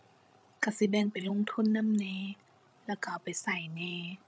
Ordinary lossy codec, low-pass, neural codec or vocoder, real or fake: none; none; codec, 16 kHz, 16 kbps, FreqCodec, larger model; fake